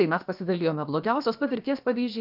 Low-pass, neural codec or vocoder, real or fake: 5.4 kHz; codec, 16 kHz, about 1 kbps, DyCAST, with the encoder's durations; fake